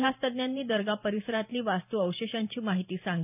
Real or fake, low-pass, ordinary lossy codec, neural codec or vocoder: fake; 3.6 kHz; none; vocoder, 44.1 kHz, 128 mel bands every 512 samples, BigVGAN v2